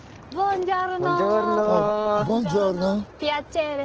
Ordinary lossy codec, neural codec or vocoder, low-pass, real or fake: Opus, 16 kbps; none; 7.2 kHz; real